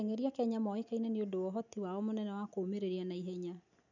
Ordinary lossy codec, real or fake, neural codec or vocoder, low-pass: none; real; none; 7.2 kHz